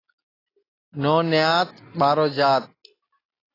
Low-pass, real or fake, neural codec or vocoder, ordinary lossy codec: 5.4 kHz; real; none; AAC, 24 kbps